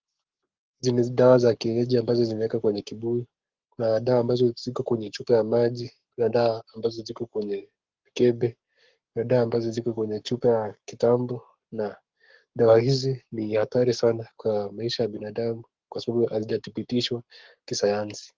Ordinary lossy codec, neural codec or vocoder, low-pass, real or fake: Opus, 16 kbps; codec, 44.1 kHz, 7.8 kbps, Pupu-Codec; 7.2 kHz; fake